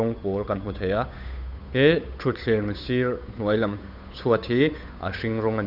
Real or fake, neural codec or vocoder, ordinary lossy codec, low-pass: fake; codec, 16 kHz, 8 kbps, FunCodec, trained on Chinese and English, 25 frames a second; none; 5.4 kHz